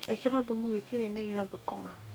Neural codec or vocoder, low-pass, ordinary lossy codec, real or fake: codec, 44.1 kHz, 2.6 kbps, DAC; none; none; fake